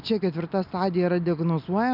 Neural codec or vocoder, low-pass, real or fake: none; 5.4 kHz; real